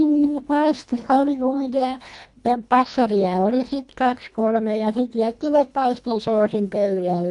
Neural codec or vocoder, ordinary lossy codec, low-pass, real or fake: codec, 24 kHz, 1.5 kbps, HILCodec; none; 10.8 kHz; fake